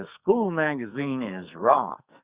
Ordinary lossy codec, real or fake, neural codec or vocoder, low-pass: Opus, 64 kbps; fake; codec, 44.1 kHz, 2.6 kbps, SNAC; 3.6 kHz